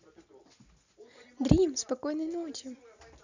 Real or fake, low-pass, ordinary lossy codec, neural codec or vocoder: real; 7.2 kHz; none; none